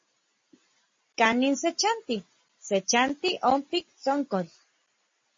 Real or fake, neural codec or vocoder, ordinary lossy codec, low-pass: real; none; MP3, 32 kbps; 7.2 kHz